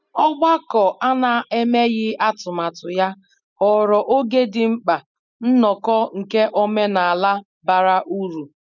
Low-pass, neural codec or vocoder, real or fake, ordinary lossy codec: 7.2 kHz; none; real; none